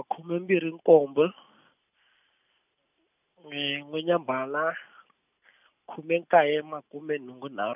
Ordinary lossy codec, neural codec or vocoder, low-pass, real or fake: none; none; 3.6 kHz; real